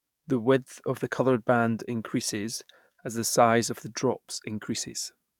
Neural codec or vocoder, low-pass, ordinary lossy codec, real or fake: codec, 44.1 kHz, 7.8 kbps, DAC; 19.8 kHz; none; fake